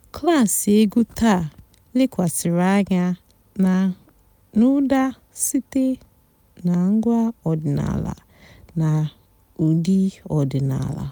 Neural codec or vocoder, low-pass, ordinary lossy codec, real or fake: none; none; none; real